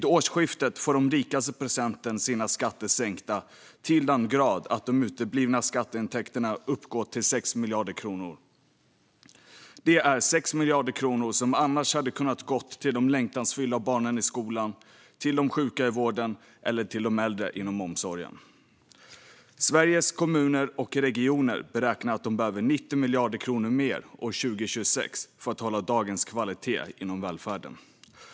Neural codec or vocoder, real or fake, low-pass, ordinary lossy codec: none; real; none; none